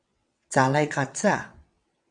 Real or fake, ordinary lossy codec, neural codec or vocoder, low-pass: fake; AAC, 64 kbps; vocoder, 22.05 kHz, 80 mel bands, WaveNeXt; 9.9 kHz